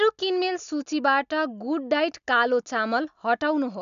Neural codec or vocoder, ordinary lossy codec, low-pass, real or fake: none; MP3, 96 kbps; 7.2 kHz; real